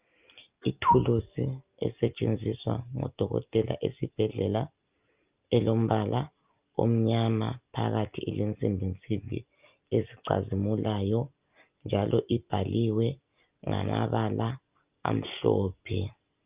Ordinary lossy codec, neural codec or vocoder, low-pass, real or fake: Opus, 24 kbps; none; 3.6 kHz; real